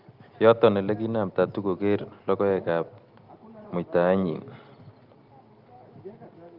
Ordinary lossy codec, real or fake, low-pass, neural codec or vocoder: Opus, 32 kbps; real; 5.4 kHz; none